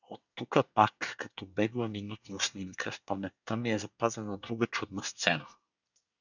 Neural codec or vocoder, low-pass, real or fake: codec, 24 kHz, 1 kbps, SNAC; 7.2 kHz; fake